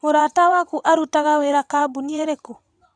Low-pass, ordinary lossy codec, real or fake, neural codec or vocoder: 9.9 kHz; none; fake; vocoder, 22.05 kHz, 80 mel bands, WaveNeXt